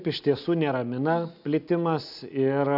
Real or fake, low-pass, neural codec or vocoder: real; 5.4 kHz; none